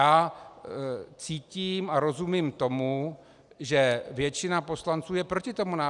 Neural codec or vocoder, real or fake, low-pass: none; real; 10.8 kHz